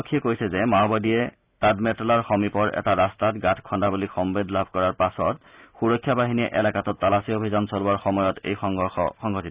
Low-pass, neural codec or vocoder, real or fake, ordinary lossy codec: 3.6 kHz; none; real; Opus, 64 kbps